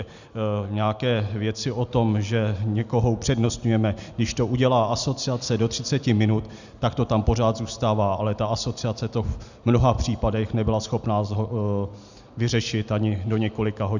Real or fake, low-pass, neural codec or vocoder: real; 7.2 kHz; none